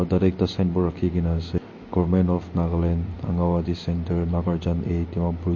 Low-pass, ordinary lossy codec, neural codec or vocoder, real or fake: 7.2 kHz; MP3, 32 kbps; none; real